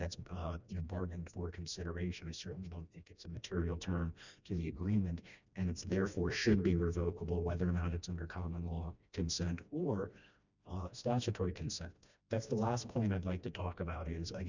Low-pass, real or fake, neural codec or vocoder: 7.2 kHz; fake; codec, 16 kHz, 1 kbps, FreqCodec, smaller model